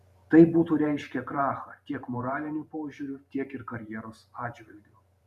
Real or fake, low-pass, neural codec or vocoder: fake; 14.4 kHz; vocoder, 44.1 kHz, 128 mel bands every 512 samples, BigVGAN v2